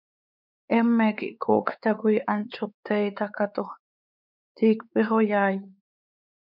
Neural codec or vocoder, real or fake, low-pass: codec, 16 kHz, 4 kbps, X-Codec, WavLM features, trained on Multilingual LibriSpeech; fake; 5.4 kHz